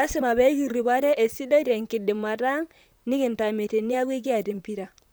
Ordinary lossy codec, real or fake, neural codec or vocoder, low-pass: none; fake; vocoder, 44.1 kHz, 128 mel bands, Pupu-Vocoder; none